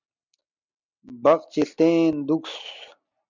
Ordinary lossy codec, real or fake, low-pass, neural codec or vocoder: MP3, 64 kbps; real; 7.2 kHz; none